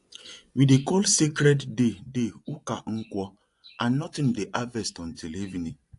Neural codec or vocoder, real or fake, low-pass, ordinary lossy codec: vocoder, 24 kHz, 100 mel bands, Vocos; fake; 10.8 kHz; AAC, 64 kbps